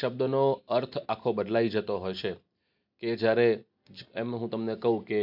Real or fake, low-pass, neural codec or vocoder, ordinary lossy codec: real; 5.4 kHz; none; MP3, 48 kbps